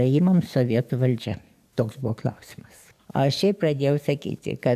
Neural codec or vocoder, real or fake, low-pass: codec, 44.1 kHz, 7.8 kbps, DAC; fake; 14.4 kHz